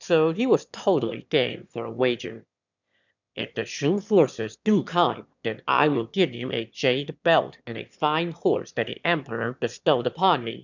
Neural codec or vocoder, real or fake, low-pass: autoencoder, 22.05 kHz, a latent of 192 numbers a frame, VITS, trained on one speaker; fake; 7.2 kHz